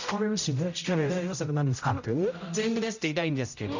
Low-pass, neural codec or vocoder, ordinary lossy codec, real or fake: 7.2 kHz; codec, 16 kHz, 0.5 kbps, X-Codec, HuBERT features, trained on general audio; none; fake